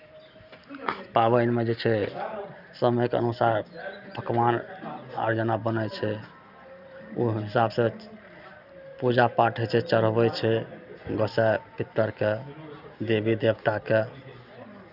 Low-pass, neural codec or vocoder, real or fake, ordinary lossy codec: 5.4 kHz; none; real; none